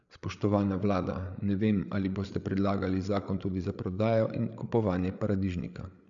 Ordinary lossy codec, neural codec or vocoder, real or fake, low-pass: none; codec, 16 kHz, 8 kbps, FreqCodec, larger model; fake; 7.2 kHz